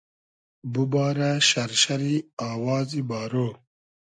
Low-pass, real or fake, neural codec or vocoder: 9.9 kHz; real; none